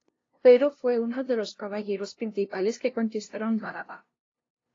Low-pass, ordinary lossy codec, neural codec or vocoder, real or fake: 7.2 kHz; AAC, 32 kbps; codec, 16 kHz, 0.5 kbps, FunCodec, trained on LibriTTS, 25 frames a second; fake